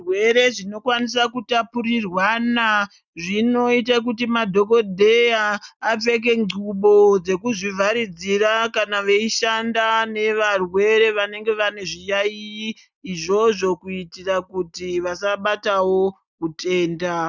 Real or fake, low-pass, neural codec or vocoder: real; 7.2 kHz; none